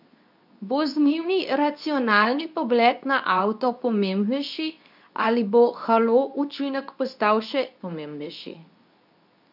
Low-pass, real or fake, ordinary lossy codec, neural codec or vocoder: 5.4 kHz; fake; none; codec, 24 kHz, 0.9 kbps, WavTokenizer, medium speech release version 2